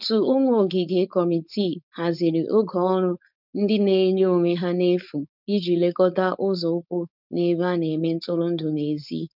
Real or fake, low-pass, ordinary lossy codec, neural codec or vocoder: fake; 5.4 kHz; none; codec, 16 kHz, 4.8 kbps, FACodec